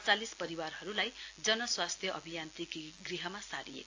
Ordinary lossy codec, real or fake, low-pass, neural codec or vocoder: AAC, 48 kbps; real; 7.2 kHz; none